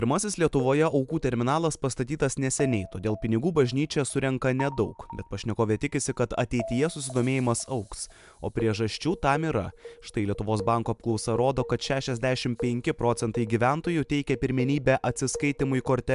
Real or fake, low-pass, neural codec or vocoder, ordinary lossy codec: real; 10.8 kHz; none; MP3, 96 kbps